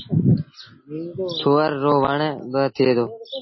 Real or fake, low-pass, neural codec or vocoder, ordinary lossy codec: real; 7.2 kHz; none; MP3, 24 kbps